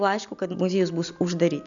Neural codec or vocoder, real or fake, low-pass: none; real; 7.2 kHz